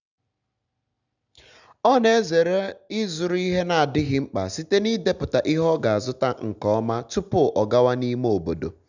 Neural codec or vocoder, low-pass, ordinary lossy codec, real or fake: none; 7.2 kHz; none; real